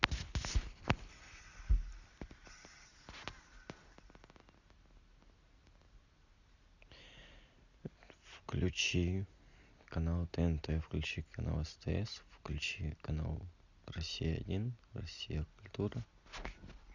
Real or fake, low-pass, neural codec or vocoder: real; 7.2 kHz; none